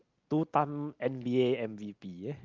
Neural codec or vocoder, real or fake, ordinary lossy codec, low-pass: none; real; Opus, 24 kbps; 7.2 kHz